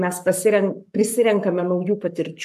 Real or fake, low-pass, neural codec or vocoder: fake; 14.4 kHz; codec, 44.1 kHz, 7.8 kbps, Pupu-Codec